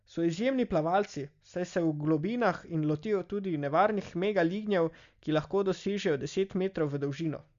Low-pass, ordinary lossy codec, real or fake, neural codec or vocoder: 7.2 kHz; Opus, 64 kbps; real; none